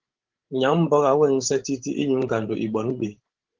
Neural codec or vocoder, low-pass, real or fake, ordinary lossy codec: codec, 16 kHz, 16 kbps, FreqCodec, larger model; 7.2 kHz; fake; Opus, 16 kbps